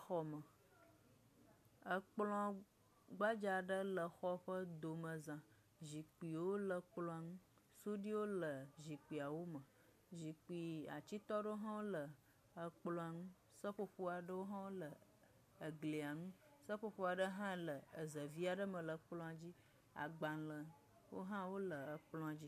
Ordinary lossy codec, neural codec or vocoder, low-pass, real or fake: MP3, 64 kbps; none; 14.4 kHz; real